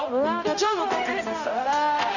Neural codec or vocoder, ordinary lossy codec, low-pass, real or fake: codec, 16 kHz, 0.5 kbps, X-Codec, HuBERT features, trained on balanced general audio; none; 7.2 kHz; fake